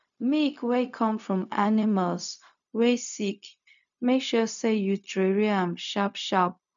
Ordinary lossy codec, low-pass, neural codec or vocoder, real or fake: none; 7.2 kHz; codec, 16 kHz, 0.4 kbps, LongCat-Audio-Codec; fake